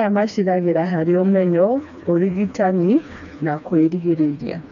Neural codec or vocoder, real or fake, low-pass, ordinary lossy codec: codec, 16 kHz, 2 kbps, FreqCodec, smaller model; fake; 7.2 kHz; none